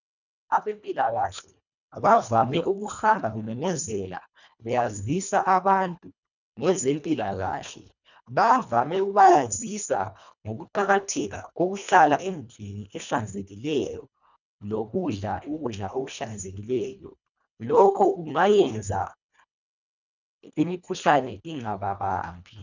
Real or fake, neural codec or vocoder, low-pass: fake; codec, 24 kHz, 1.5 kbps, HILCodec; 7.2 kHz